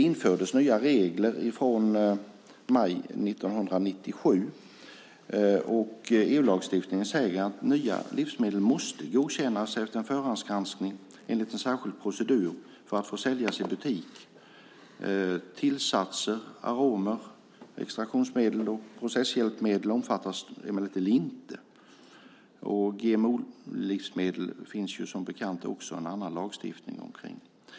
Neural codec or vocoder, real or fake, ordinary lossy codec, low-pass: none; real; none; none